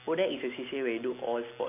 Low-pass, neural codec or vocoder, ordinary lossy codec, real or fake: 3.6 kHz; none; none; real